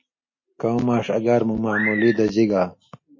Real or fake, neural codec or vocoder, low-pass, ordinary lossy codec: real; none; 7.2 kHz; MP3, 32 kbps